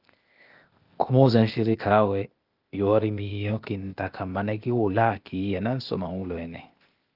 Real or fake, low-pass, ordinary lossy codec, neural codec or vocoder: fake; 5.4 kHz; Opus, 32 kbps; codec, 16 kHz, 0.8 kbps, ZipCodec